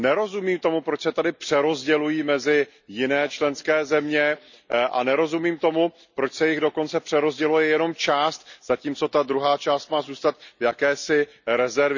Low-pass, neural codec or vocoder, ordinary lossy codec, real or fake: 7.2 kHz; none; none; real